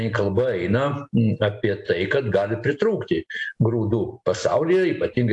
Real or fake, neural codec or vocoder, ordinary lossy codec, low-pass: real; none; AAC, 64 kbps; 10.8 kHz